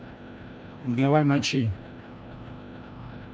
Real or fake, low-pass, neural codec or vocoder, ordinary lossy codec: fake; none; codec, 16 kHz, 1 kbps, FreqCodec, larger model; none